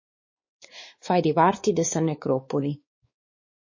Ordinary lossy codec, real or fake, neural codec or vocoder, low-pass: MP3, 32 kbps; fake; codec, 16 kHz, 4 kbps, X-Codec, WavLM features, trained on Multilingual LibriSpeech; 7.2 kHz